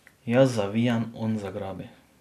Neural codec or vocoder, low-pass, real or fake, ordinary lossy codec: none; 14.4 kHz; real; AAC, 96 kbps